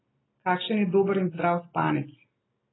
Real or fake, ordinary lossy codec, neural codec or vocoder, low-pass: real; AAC, 16 kbps; none; 7.2 kHz